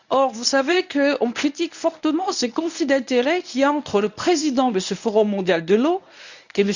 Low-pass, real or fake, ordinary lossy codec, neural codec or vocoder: 7.2 kHz; fake; none; codec, 24 kHz, 0.9 kbps, WavTokenizer, medium speech release version 1